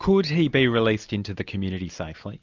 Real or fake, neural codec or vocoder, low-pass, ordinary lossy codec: real; none; 7.2 kHz; MP3, 64 kbps